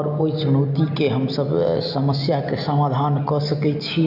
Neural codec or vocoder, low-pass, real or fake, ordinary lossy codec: none; 5.4 kHz; real; AAC, 48 kbps